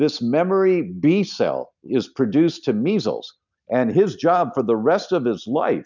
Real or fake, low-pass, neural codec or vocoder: real; 7.2 kHz; none